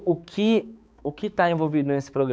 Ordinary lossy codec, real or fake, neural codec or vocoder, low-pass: none; fake; codec, 16 kHz, 4 kbps, X-Codec, HuBERT features, trained on balanced general audio; none